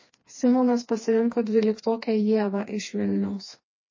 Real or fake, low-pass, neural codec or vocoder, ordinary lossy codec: fake; 7.2 kHz; codec, 16 kHz, 2 kbps, FreqCodec, smaller model; MP3, 32 kbps